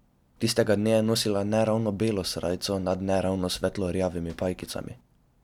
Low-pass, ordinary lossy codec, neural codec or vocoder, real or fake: 19.8 kHz; none; none; real